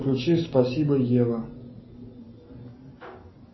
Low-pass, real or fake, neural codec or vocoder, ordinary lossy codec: 7.2 kHz; real; none; MP3, 24 kbps